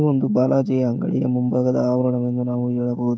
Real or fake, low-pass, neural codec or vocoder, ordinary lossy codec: fake; none; codec, 16 kHz, 16 kbps, FreqCodec, smaller model; none